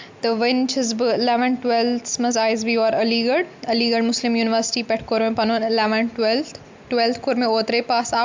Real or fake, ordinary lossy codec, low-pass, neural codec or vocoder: real; MP3, 64 kbps; 7.2 kHz; none